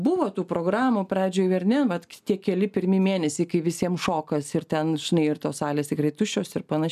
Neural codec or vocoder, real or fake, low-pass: none; real; 14.4 kHz